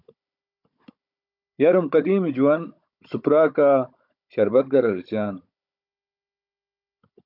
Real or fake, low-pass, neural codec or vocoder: fake; 5.4 kHz; codec, 16 kHz, 16 kbps, FunCodec, trained on Chinese and English, 50 frames a second